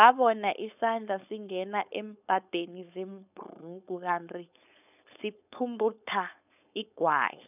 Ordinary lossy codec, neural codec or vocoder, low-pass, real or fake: none; codec, 16 kHz, 4.8 kbps, FACodec; 3.6 kHz; fake